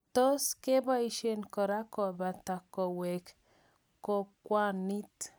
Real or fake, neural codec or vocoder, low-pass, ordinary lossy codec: real; none; none; none